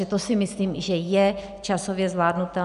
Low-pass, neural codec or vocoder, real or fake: 10.8 kHz; none; real